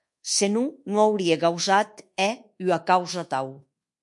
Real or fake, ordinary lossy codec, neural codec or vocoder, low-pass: fake; MP3, 48 kbps; codec, 24 kHz, 1.2 kbps, DualCodec; 10.8 kHz